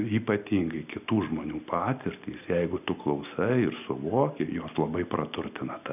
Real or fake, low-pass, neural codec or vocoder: real; 3.6 kHz; none